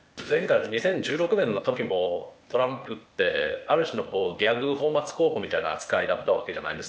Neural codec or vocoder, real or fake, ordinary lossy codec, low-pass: codec, 16 kHz, 0.8 kbps, ZipCodec; fake; none; none